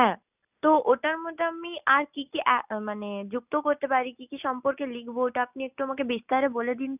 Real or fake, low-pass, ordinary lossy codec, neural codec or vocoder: real; 3.6 kHz; none; none